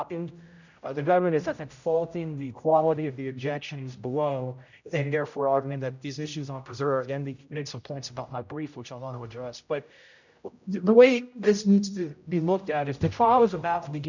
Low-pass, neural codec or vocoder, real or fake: 7.2 kHz; codec, 16 kHz, 0.5 kbps, X-Codec, HuBERT features, trained on general audio; fake